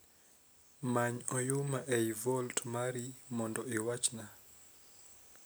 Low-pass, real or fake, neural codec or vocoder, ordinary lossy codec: none; real; none; none